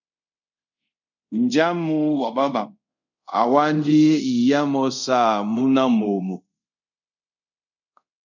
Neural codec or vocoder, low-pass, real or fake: codec, 24 kHz, 0.5 kbps, DualCodec; 7.2 kHz; fake